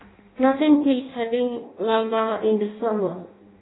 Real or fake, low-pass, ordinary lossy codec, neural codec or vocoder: fake; 7.2 kHz; AAC, 16 kbps; codec, 16 kHz in and 24 kHz out, 0.6 kbps, FireRedTTS-2 codec